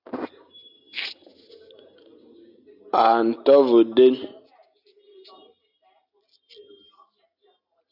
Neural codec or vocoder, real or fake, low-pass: none; real; 5.4 kHz